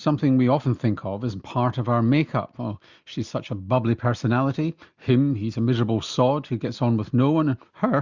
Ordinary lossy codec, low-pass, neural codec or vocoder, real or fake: Opus, 64 kbps; 7.2 kHz; none; real